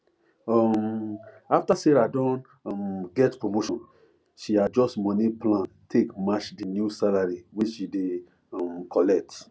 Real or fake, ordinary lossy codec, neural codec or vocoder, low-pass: real; none; none; none